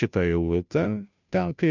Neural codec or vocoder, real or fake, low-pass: codec, 16 kHz, 0.5 kbps, FunCodec, trained on Chinese and English, 25 frames a second; fake; 7.2 kHz